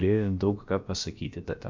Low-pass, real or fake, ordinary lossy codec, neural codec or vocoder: 7.2 kHz; fake; MP3, 48 kbps; codec, 16 kHz, about 1 kbps, DyCAST, with the encoder's durations